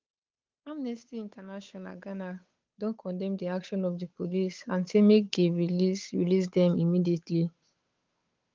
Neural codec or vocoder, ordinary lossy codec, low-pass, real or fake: codec, 16 kHz, 8 kbps, FunCodec, trained on Chinese and English, 25 frames a second; Opus, 32 kbps; 7.2 kHz; fake